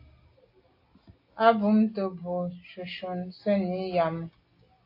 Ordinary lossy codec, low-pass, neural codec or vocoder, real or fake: AAC, 32 kbps; 5.4 kHz; none; real